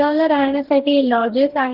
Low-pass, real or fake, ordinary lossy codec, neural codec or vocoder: 5.4 kHz; fake; Opus, 16 kbps; codec, 44.1 kHz, 2.6 kbps, DAC